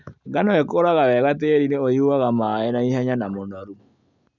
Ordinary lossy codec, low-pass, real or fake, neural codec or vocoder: none; 7.2 kHz; real; none